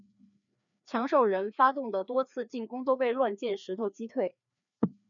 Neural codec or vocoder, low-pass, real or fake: codec, 16 kHz, 2 kbps, FreqCodec, larger model; 7.2 kHz; fake